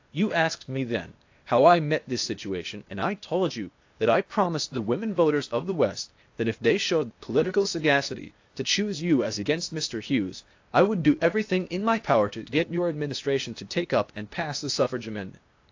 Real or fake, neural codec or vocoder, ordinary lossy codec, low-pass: fake; codec, 16 kHz, 0.8 kbps, ZipCodec; AAC, 48 kbps; 7.2 kHz